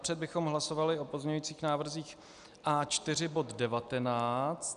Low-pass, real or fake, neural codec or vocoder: 10.8 kHz; real; none